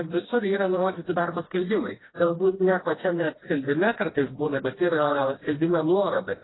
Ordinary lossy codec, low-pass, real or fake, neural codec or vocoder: AAC, 16 kbps; 7.2 kHz; fake; codec, 16 kHz, 1 kbps, FreqCodec, smaller model